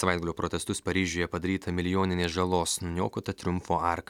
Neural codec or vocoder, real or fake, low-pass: none; real; 19.8 kHz